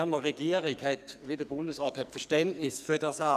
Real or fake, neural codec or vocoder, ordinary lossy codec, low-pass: fake; codec, 44.1 kHz, 2.6 kbps, SNAC; none; 14.4 kHz